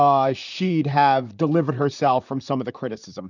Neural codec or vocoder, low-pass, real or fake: vocoder, 44.1 kHz, 128 mel bands, Pupu-Vocoder; 7.2 kHz; fake